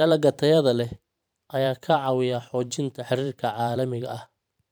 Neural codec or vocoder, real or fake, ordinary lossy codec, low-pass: vocoder, 44.1 kHz, 128 mel bands every 512 samples, BigVGAN v2; fake; none; none